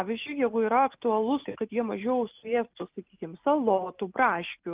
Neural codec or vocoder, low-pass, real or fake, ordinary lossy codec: none; 3.6 kHz; real; Opus, 24 kbps